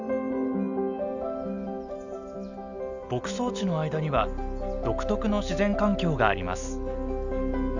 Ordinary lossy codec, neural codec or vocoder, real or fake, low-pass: none; none; real; 7.2 kHz